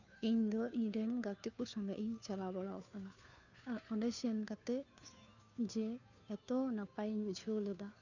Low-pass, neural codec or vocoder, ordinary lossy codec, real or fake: 7.2 kHz; codec, 16 kHz, 2 kbps, FunCodec, trained on Chinese and English, 25 frames a second; none; fake